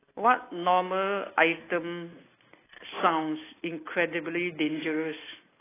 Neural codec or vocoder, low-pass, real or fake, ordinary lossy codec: none; 3.6 kHz; real; AAC, 16 kbps